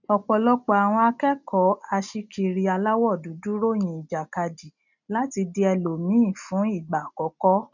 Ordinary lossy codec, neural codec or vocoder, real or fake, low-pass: none; none; real; 7.2 kHz